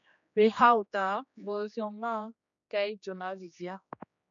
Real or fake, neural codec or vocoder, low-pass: fake; codec, 16 kHz, 2 kbps, X-Codec, HuBERT features, trained on general audio; 7.2 kHz